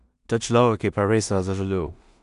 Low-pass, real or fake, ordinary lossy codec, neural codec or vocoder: 10.8 kHz; fake; none; codec, 16 kHz in and 24 kHz out, 0.4 kbps, LongCat-Audio-Codec, two codebook decoder